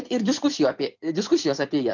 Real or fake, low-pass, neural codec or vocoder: fake; 7.2 kHz; vocoder, 24 kHz, 100 mel bands, Vocos